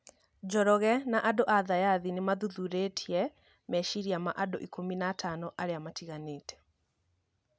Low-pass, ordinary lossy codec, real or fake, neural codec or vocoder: none; none; real; none